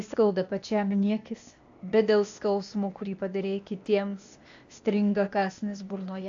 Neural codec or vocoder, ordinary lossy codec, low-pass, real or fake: codec, 16 kHz, 0.8 kbps, ZipCodec; AAC, 64 kbps; 7.2 kHz; fake